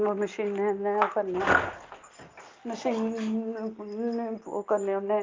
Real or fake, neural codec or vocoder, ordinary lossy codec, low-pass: real; none; Opus, 24 kbps; 7.2 kHz